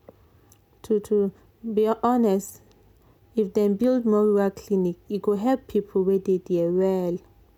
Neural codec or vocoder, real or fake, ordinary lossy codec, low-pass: none; real; none; 19.8 kHz